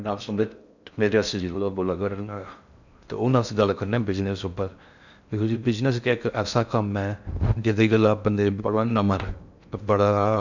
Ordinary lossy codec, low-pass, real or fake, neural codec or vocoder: none; 7.2 kHz; fake; codec, 16 kHz in and 24 kHz out, 0.6 kbps, FocalCodec, streaming, 4096 codes